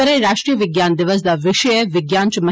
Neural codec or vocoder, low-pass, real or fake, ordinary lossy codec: none; none; real; none